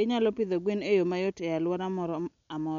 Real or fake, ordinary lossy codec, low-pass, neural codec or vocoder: real; none; 7.2 kHz; none